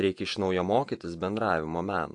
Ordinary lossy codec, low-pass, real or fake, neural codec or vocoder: AAC, 64 kbps; 10.8 kHz; fake; vocoder, 48 kHz, 128 mel bands, Vocos